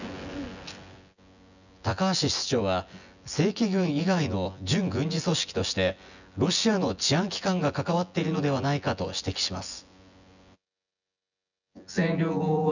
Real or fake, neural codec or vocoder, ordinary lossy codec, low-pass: fake; vocoder, 24 kHz, 100 mel bands, Vocos; none; 7.2 kHz